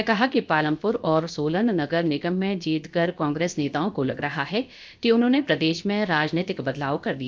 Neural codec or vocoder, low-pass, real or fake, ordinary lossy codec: codec, 16 kHz, about 1 kbps, DyCAST, with the encoder's durations; none; fake; none